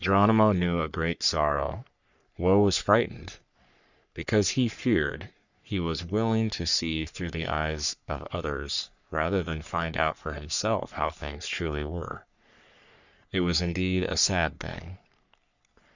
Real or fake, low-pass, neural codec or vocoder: fake; 7.2 kHz; codec, 44.1 kHz, 3.4 kbps, Pupu-Codec